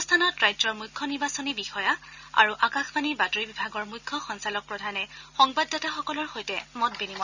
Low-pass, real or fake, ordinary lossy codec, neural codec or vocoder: 7.2 kHz; real; none; none